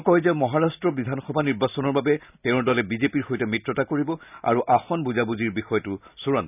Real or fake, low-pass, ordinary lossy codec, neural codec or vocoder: real; 3.6 kHz; none; none